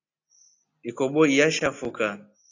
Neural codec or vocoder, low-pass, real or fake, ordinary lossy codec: none; 7.2 kHz; real; AAC, 48 kbps